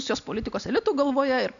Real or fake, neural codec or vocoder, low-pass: real; none; 7.2 kHz